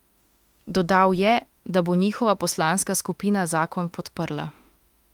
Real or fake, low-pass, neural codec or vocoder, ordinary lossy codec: fake; 19.8 kHz; autoencoder, 48 kHz, 32 numbers a frame, DAC-VAE, trained on Japanese speech; Opus, 32 kbps